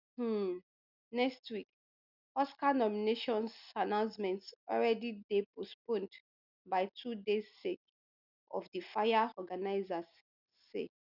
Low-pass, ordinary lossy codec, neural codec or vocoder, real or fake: 5.4 kHz; none; none; real